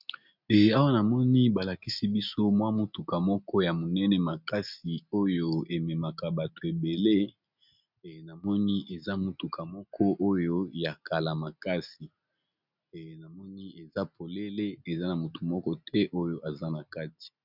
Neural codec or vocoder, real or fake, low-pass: none; real; 5.4 kHz